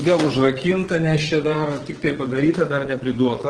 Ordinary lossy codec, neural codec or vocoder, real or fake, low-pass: Opus, 16 kbps; vocoder, 22.05 kHz, 80 mel bands, WaveNeXt; fake; 9.9 kHz